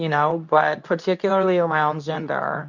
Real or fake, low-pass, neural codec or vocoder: fake; 7.2 kHz; codec, 24 kHz, 0.9 kbps, WavTokenizer, medium speech release version 2